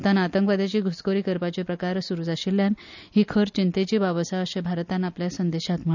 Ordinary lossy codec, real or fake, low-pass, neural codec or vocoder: none; real; 7.2 kHz; none